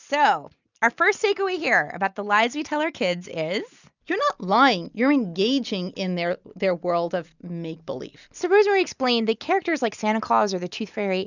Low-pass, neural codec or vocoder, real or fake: 7.2 kHz; none; real